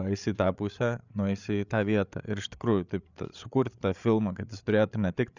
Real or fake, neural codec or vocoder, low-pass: fake; codec, 16 kHz, 8 kbps, FreqCodec, larger model; 7.2 kHz